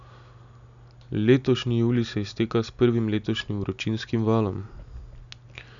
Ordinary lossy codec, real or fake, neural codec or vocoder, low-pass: none; real; none; 7.2 kHz